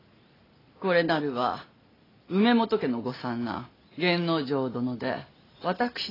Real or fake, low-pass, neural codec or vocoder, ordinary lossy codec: real; 5.4 kHz; none; AAC, 24 kbps